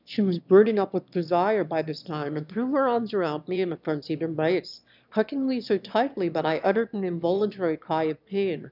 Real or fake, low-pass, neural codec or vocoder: fake; 5.4 kHz; autoencoder, 22.05 kHz, a latent of 192 numbers a frame, VITS, trained on one speaker